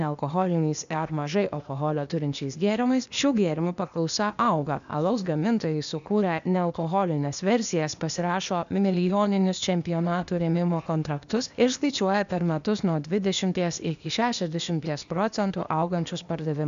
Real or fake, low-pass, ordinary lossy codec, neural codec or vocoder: fake; 7.2 kHz; AAC, 96 kbps; codec, 16 kHz, 0.8 kbps, ZipCodec